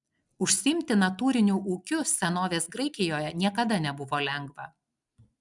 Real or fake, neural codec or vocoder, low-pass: real; none; 10.8 kHz